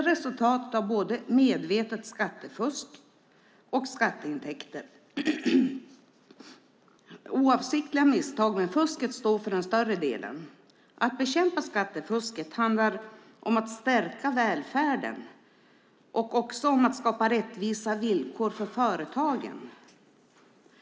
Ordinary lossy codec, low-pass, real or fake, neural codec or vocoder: none; none; real; none